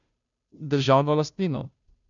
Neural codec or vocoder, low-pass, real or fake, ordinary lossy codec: codec, 16 kHz, 0.5 kbps, FunCodec, trained on Chinese and English, 25 frames a second; 7.2 kHz; fake; none